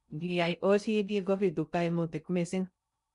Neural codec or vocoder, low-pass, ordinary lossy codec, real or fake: codec, 16 kHz in and 24 kHz out, 0.6 kbps, FocalCodec, streaming, 2048 codes; 10.8 kHz; none; fake